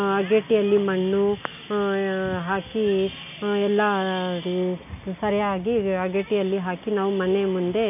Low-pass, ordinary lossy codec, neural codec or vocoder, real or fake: 3.6 kHz; none; none; real